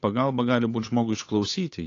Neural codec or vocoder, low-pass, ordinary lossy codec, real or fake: codec, 16 kHz, 8 kbps, FunCodec, trained on Chinese and English, 25 frames a second; 7.2 kHz; AAC, 32 kbps; fake